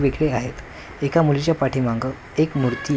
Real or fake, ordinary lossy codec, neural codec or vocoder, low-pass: real; none; none; none